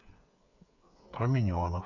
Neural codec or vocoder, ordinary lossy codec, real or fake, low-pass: codec, 16 kHz, 8 kbps, FreqCodec, smaller model; none; fake; 7.2 kHz